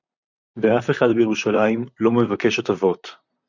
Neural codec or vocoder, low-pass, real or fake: vocoder, 44.1 kHz, 128 mel bands, Pupu-Vocoder; 7.2 kHz; fake